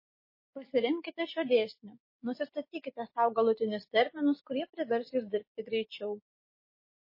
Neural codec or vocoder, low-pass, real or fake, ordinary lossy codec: none; 5.4 kHz; real; MP3, 24 kbps